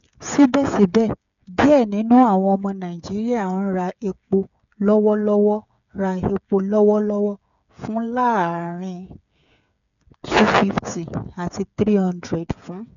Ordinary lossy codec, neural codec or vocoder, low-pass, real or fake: none; codec, 16 kHz, 8 kbps, FreqCodec, smaller model; 7.2 kHz; fake